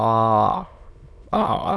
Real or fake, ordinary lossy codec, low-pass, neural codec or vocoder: fake; none; none; autoencoder, 22.05 kHz, a latent of 192 numbers a frame, VITS, trained on many speakers